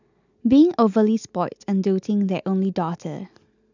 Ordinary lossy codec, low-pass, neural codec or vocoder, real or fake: none; 7.2 kHz; none; real